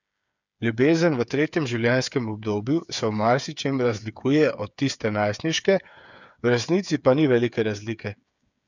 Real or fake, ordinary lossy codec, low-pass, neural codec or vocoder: fake; none; 7.2 kHz; codec, 16 kHz, 8 kbps, FreqCodec, smaller model